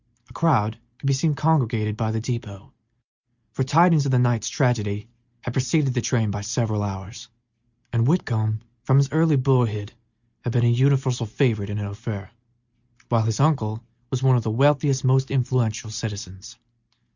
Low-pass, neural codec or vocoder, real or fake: 7.2 kHz; none; real